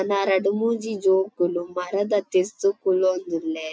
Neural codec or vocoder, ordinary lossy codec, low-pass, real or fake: none; none; none; real